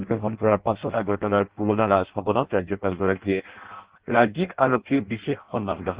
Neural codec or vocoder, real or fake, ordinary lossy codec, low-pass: codec, 16 kHz in and 24 kHz out, 0.6 kbps, FireRedTTS-2 codec; fake; Opus, 16 kbps; 3.6 kHz